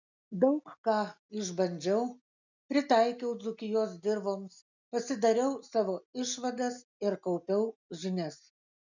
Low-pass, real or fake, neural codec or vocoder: 7.2 kHz; real; none